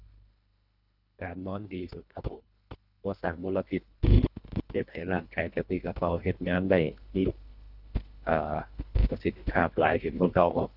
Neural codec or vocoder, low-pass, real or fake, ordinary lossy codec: codec, 24 kHz, 1.5 kbps, HILCodec; 5.4 kHz; fake; none